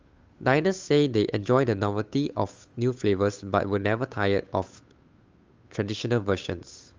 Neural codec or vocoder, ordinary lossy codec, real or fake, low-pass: codec, 16 kHz, 8 kbps, FunCodec, trained on Chinese and English, 25 frames a second; Opus, 32 kbps; fake; 7.2 kHz